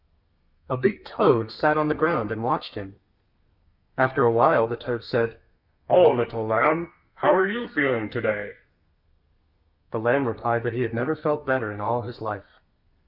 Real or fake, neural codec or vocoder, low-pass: fake; codec, 32 kHz, 1.9 kbps, SNAC; 5.4 kHz